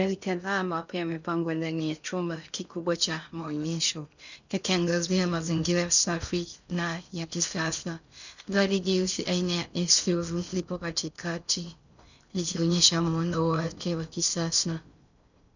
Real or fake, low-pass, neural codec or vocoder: fake; 7.2 kHz; codec, 16 kHz in and 24 kHz out, 0.6 kbps, FocalCodec, streaming, 2048 codes